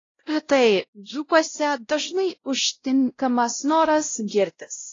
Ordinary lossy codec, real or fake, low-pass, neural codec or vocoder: AAC, 32 kbps; fake; 7.2 kHz; codec, 16 kHz, 0.5 kbps, X-Codec, WavLM features, trained on Multilingual LibriSpeech